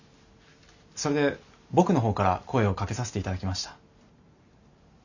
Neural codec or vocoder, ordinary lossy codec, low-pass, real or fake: none; none; 7.2 kHz; real